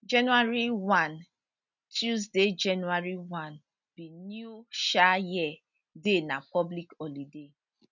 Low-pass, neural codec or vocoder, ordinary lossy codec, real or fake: 7.2 kHz; none; none; real